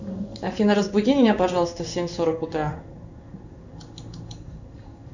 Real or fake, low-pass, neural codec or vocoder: fake; 7.2 kHz; codec, 16 kHz in and 24 kHz out, 1 kbps, XY-Tokenizer